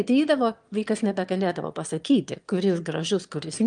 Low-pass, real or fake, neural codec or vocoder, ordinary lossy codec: 9.9 kHz; fake; autoencoder, 22.05 kHz, a latent of 192 numbers a frame, VITS, trained on one speaker; Opus, 32 kbps